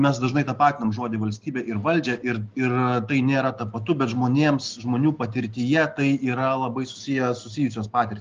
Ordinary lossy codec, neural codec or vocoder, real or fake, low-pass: Opus, 32 kbps; none; real; 7.2 kHz